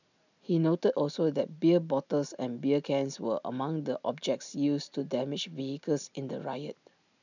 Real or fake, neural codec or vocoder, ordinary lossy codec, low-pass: real; none; none; 7.2 kHz